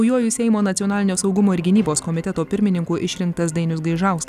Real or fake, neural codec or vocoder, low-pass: real; none; 14.4 kHz